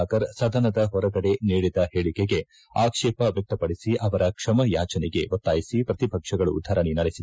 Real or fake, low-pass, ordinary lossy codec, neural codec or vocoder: real; none; none; none